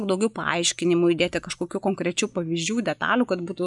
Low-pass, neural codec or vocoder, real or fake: 10.8 kHz; none; real